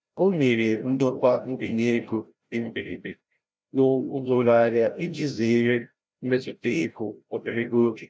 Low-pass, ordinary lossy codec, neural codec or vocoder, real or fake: none; none; codec, 16 kHz, 0.5 kbps, FreqCodec, larger model; fake